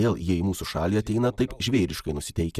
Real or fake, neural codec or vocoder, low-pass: real; none; 14.4 kHz